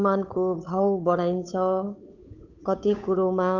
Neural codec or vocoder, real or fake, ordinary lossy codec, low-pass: codec, 16 kHz, 8 kbps, FunCodec, trained on Chinese and English, 25 frames a second; fake; none; 7.2 kHz